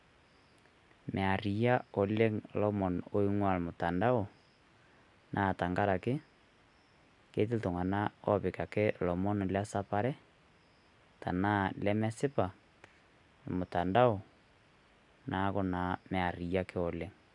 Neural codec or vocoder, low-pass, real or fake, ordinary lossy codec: none; 10.8 kHz; real; none